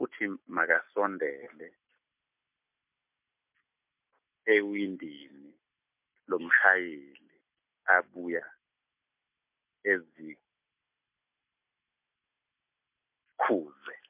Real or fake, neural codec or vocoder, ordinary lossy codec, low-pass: real; none; MP3, 32 kbps; 3.6 kHz